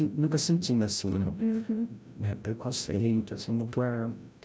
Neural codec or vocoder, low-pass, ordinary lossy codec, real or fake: codec, 16 kHz, 0.5 kbps, FreqCodec, larger model; none; none; fake